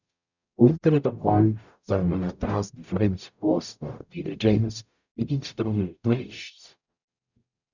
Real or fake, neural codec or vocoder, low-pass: fake; codec, 44.1 kHz, 0.9 kbps, DAC; 7.2 kHz